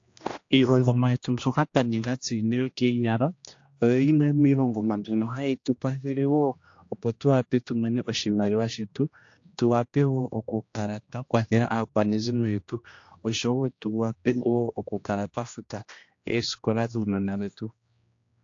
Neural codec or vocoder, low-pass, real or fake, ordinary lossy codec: codec, 16 kHz, 1 kbps, X-Codec, HuBERT features, trained on general audio; 7.2 kHz; fake; AAC, 48 kbps